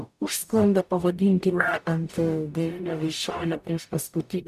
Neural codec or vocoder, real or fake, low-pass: codec, 44.1 kHz, 0.9 kbps, DAC; fake; 14.4 kHz